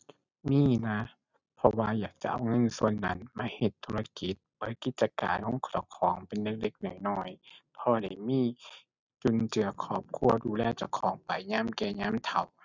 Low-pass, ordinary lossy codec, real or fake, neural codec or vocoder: 7.2 kHz; none; real; none